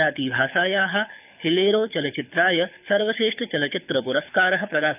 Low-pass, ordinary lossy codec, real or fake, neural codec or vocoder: 3.6 kHz; none; fake; codec, 24 kHz, 6 kbps, HILCodec